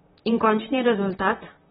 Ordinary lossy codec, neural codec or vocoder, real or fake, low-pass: AAC, 16 kbps; vocoder, 44.1 kHz, 128 mel bands, Pupu-Vocoder; fake; 19.8 kHz